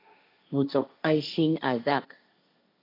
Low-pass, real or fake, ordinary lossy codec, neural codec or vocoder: 5.4 kHz; fake; AAC, 32 kbps; codec, 16 kHz, 1.1 kbps, Voila-Tokenizer